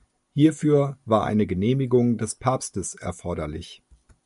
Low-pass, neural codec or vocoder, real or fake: 10.8 kHz; none; real